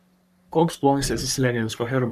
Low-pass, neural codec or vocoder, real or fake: 14.4 kHz; codec, 44.1 kHz, 3.4 kbps, Pupu-Codec; fake